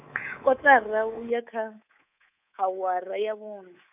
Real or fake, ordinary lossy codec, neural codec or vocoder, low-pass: fake; none; codec, 24 kHz, 3.1 kbps, DualCodec; 3.6 kHz